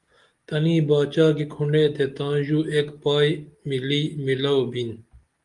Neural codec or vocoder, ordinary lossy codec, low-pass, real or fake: none; Opus, 32 kbps; 10.8 kHz; real